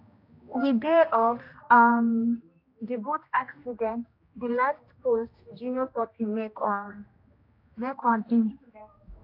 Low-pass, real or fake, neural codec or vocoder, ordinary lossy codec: 5.4 kHz; fake; codec, 16 kHz, 1 kbps, X-Codec, HuBERT features, trained on general audio; AAC, 32 kbps